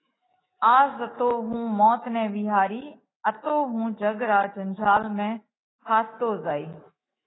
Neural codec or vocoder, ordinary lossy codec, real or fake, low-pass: autoencoder, 48 kHz, 128 numbers a frame, DAC-VAE, trained on Japanese speech; AAC, 16 kbps; fake; 7.2 kHz